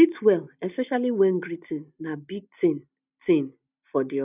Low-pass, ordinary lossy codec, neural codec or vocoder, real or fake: 3.6 kHz; none; none; real